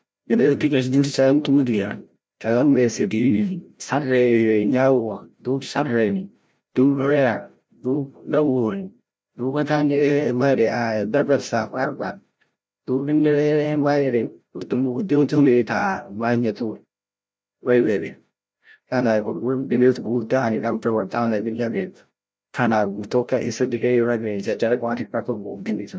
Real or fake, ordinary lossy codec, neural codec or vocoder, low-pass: fake; none; codec, 16 kHz, 0.5 kbps, FreqCodec, larger model; none